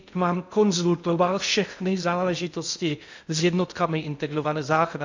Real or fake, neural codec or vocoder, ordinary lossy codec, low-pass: fake; codec, 16 kHz in and 24 kHz out, 0.6 kbps, FocalCodec, streaming, 2048 codes; MP3, 64 kbps; 7.2 kHz